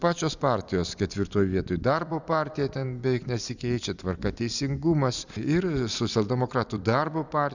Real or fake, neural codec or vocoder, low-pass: fake; vocoder, 44.1 kHz, 128 mel bands every 256 samples, BigVGAN v2; 7.2 kHz